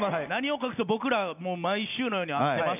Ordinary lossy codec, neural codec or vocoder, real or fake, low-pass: none; none; real; 3.6 kHz